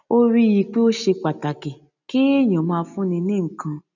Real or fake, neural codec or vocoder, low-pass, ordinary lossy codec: real; none; 7.2 kHz; none